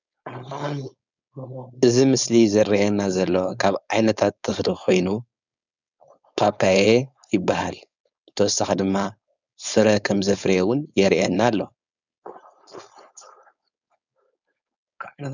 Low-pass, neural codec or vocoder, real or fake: 7.2 kHz; codec, 16 kHz, 4.8 kbps, FACodec; fake